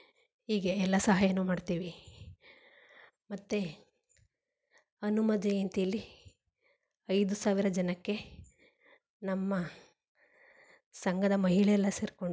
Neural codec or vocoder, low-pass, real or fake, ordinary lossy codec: none; none; real; none